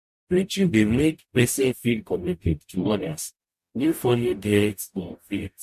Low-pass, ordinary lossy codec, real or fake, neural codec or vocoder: 14.4 kHz; MP3, 64 kbps; fake; codec, 44.1 kHz, 0.9 kbps, DAC